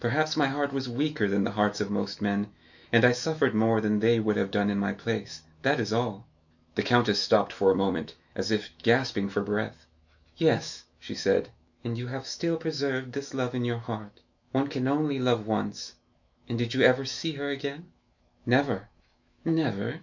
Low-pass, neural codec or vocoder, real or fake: 7.2 kHz; autoencoder, 48 kHz, 128 numbers a frame, DAC-VAE, trained on Japanese speech; fake